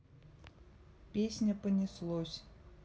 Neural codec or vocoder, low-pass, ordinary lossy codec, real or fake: none; none; none; real